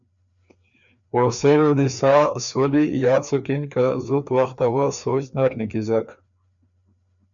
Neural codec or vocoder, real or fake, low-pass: codec, 16 kHz, 2 kbps, FreqCodec, larger model; fake; 7.2 kHz